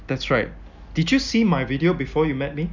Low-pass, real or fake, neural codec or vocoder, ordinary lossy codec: 7.2 kHz; real; none; none